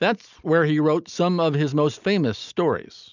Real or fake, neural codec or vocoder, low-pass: real; none; 7.2 kHz